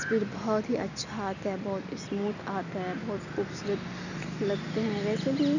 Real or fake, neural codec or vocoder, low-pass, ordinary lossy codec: real; none; 7.2 kHz; none